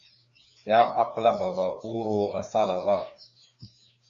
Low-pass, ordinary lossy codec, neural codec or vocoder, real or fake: 7.2 kHz; Opus, 64 kbps; codec, 16 kHz, 2 kbps, FreqCodec, larger model; fake